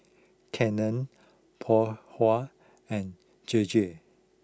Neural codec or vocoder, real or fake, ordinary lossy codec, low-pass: none; real; none; none